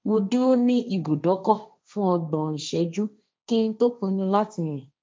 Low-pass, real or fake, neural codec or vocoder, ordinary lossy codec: none; fake; codec, 16 kHz, 1.1 kbps, Voila-Tokenizer; none